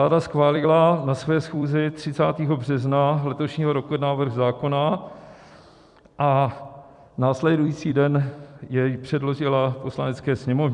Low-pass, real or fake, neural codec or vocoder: 10.8 kHz; fake; vocoder, 44.1 kHz, 128 mel bands every 256 samples, BigVGAN v2